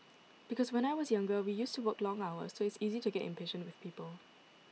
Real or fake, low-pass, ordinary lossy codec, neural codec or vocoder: real; none; none; none